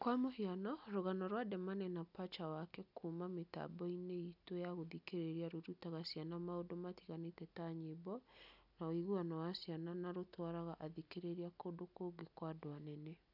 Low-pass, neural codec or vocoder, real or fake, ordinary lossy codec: 5.4 kHz; none; real; none